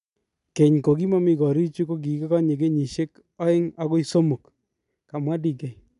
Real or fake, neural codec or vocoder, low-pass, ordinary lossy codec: real; none; 10.8 kHz; none